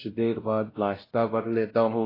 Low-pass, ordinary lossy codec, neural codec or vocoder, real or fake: 5.4 kHz; AAC, 24 kbps; codec, 16 kHz, 0.5 kbps, X-Codec, WavLM features, trained on Multilingual LibriSpeech; fake